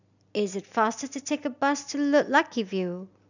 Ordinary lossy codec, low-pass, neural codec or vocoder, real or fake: none; 7.2 kHz; none; real